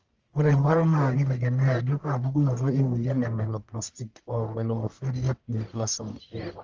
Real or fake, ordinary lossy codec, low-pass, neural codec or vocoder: fake; Opus, 24 kbps; 7.2 kHz; codec, 44.1 kHz, 1.7 kbps, Pupu-Codec